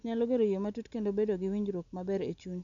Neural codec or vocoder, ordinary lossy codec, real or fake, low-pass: none; none; real; 7.2 kHz